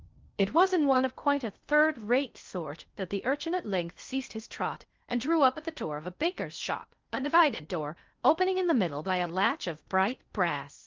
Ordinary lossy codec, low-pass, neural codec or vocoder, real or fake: Opus, 32 kbps; 7.2 kHz; codec, 16 kHz in and 24 kHz out, 0.6 kbps, FocalCodec, streaming, 2048 codes; fake